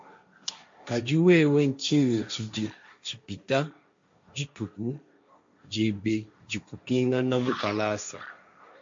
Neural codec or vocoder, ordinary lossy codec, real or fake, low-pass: codec, 16 kHz, 1.1 kbps, Voila-Tokenizer; MP3, 48 kbps; fake; 7.2 kHz